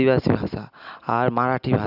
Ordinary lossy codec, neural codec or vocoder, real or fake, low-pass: none; none; real; 5.4 kHz